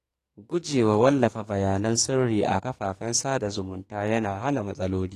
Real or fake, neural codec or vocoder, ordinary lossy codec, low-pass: fake; codec, 44.1 kHz, 2.6 kbps, SNAC; AAC, 48 kbps; 14.4 kHz